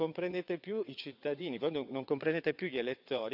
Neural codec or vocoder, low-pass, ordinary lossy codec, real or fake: vocoder, 22.05 kHz, 80 mel bands, WaveNeXt; 5.4 kHz; none; fake